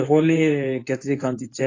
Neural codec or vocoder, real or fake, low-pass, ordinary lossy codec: codec, 24 kHz, 0.9 kbps, WavTokenizer, medium speech release version 2; fake; 7.2 kHz; AAC, 32 kbps